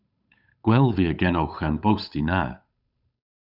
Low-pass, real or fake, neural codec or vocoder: 5.4 kHz; fake; codec, 16 kHz, 8 kbps, FunCodec, trained on Chinese and English, 25 frames a second